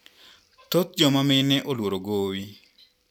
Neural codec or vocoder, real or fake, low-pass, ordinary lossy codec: none; real; 19.8 kHz; none